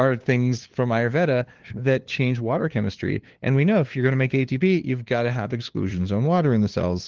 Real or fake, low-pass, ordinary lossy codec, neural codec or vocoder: fake; 7.2 kHz; Opus, 16 kbps; codec, 16 kHz, 2 kbps, FunCodec, trained on LibriTTS, 25 frames a second